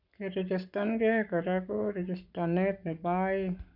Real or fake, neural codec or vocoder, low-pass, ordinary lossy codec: fake; codec, 44.1 kHz, 7.8 kbps, Pupu-Codec; 5.4 kHz; none